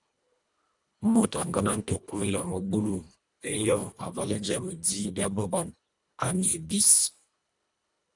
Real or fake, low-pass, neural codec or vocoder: fake; 10.8 kHz; codec, 24 kHz, 1.5 kbps, HILCodec